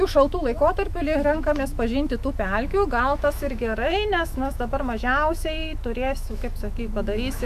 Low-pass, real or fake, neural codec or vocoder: 14.4 kHz; fake; autoencoder, 48 kHz, 128 numbers a frame, DAC-VAE, trained on Japanese speech